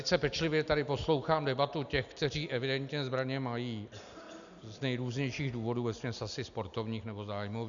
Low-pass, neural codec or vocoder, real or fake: 7.2 kHz; none; real